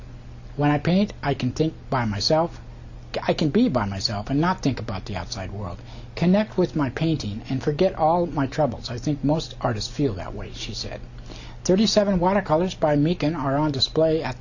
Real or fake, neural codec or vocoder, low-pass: real; none; 7.2 kHz